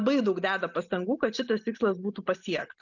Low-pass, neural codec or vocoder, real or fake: 7.2 kHz; none; real